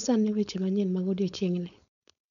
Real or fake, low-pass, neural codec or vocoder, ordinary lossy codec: fake; 7.2 kHz; codec, 16 kHz, 4.8 kbps, FACodec; none